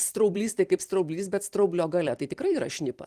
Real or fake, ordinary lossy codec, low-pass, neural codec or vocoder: fake; Opus, 24 kbps; 14.4 kHz; vocoder, 44.1 kHz, 128 mel bands every 256 samples, BigVGAN v2